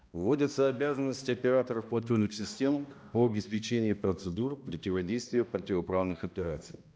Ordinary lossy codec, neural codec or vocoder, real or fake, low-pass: none; codec, 16 kHz, 1 kbps, X-Codec, HuBERT features, trained on balanced general audio; fake; none